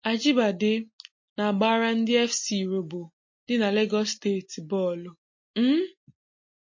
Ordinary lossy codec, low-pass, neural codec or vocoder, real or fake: MP3, 32 kbps; 7.2 kHz; none; real